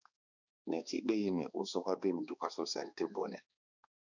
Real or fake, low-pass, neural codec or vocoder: fake; 7.2 kHz; codec, 16 kHz, 2 kbps, X-Codec, HuBERT features, trained on balanced general audio